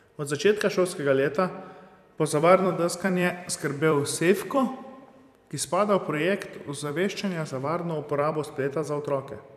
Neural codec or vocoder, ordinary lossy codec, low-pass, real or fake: vocoder, 44.1 kHz, 128 mel bands every 256 samples, BigVGAN v2; none; 14.4 kHz; fake